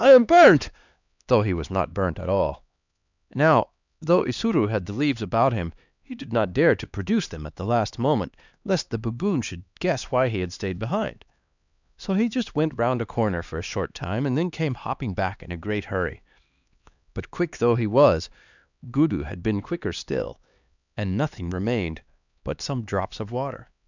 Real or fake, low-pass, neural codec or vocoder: fake; 7.2 kHz; codec, 16 kHz, 2 kbps, X-Codec, HuBERT features, trained on LibriSpeech